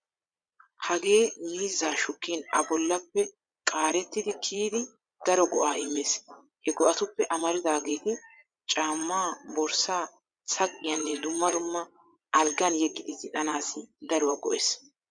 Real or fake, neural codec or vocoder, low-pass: fake; vocoder, 22.05 kHz, 80 mel bands, Vocos; 9.9 kHz